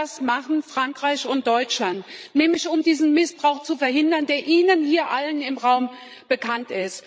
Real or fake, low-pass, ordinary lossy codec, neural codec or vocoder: fake; none; none; codec, 16 kHz, 16 kbps, FreqCodec, larger model